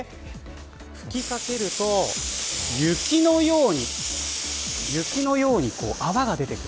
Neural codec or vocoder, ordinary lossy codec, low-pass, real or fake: none; none; none; real